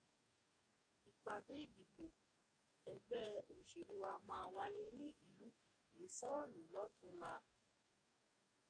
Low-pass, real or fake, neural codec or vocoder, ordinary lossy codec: 9.9 kHz; fake; codec, 44.1 kHz, 2.6 kbps, DAC; MP3, 48 kbps